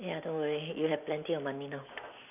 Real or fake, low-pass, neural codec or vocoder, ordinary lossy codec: real; 3.6 kHz; none; none